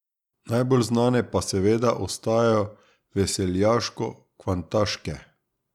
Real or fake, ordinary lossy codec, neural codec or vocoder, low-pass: real; none; none; 19.8 kHz